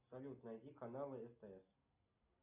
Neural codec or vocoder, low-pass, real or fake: none; 3.6 kHz; real